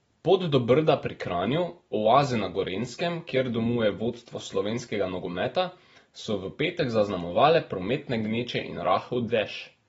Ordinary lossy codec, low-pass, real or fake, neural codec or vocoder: AAC, 24 kbps; 19.8 kHz; real; none